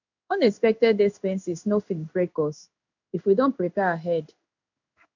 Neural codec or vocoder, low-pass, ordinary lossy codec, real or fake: codec, 16 kHz in and 24 kHz out, 1 kbps, XY-Tokenizer; 7.2 kHz; none; fake